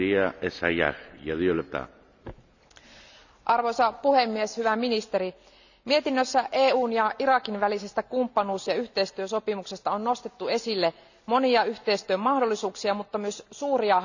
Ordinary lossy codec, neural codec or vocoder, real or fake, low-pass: none; none; real; 7.2 kHz